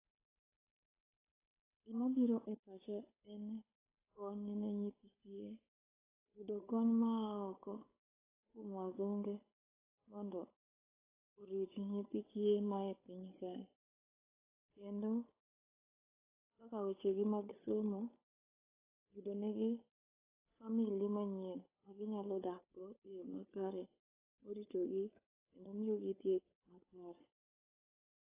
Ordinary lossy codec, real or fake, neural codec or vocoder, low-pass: AAC, 16 kbps; fake; codec, 16 kHz, 8 kbps, FunCodec, trained on Chinese and English, 25 frames a second; 3.6 kHz